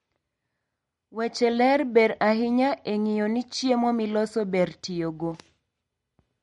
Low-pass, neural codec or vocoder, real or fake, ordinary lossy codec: 19.8 kHz; none; real; MP3, 48 kbps